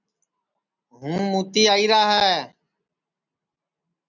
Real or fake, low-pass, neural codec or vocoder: real; 7.2 kHz; none